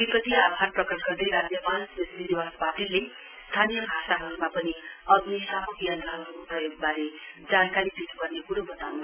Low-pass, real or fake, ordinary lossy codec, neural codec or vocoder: 3.6 kHz; real; none; none